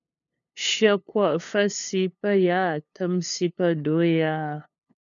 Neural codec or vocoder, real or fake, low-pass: codec, 16 kHz, 2 kbps, FunCodec, trained on LibriTTS, 25 frames a second; fake; 7.2 kHz